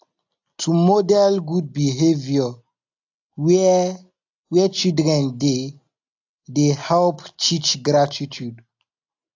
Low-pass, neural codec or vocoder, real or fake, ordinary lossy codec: 7.2 kHz; none; real; AAC, 48 kbps